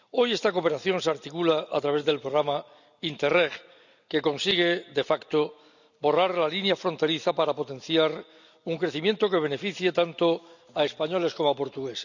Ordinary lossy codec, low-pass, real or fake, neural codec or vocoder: none; 7.2 kHz; real; none